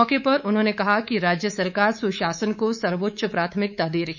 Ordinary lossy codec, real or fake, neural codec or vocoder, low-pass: none; fake; codec, 24 kHz, 3.1 kbps, DualCodec; 7.2 kHz